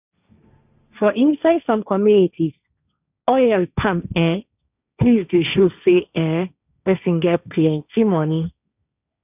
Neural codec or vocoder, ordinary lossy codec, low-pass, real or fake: codec, 16 kHz, 1.1 kbps, Voila-Tokenizer; none; 3.6 kHz; fake